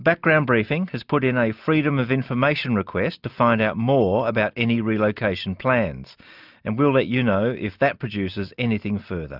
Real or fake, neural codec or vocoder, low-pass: real; none; 5.4 kHz